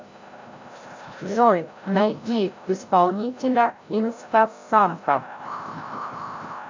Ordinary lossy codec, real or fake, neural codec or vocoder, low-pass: MP3, 64 kbps; fake; codec, 16 kHz, 0.5 kbps, FreqCodec, larger model; 7.2 kHz